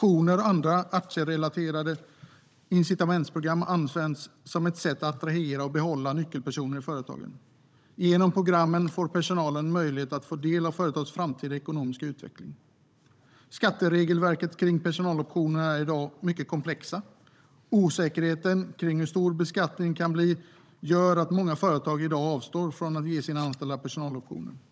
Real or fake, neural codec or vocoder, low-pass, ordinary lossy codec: fake; codec, 16 kHz, 16 kbps, FunCodec, trained on Chinese and English, 50 frames a second; none; none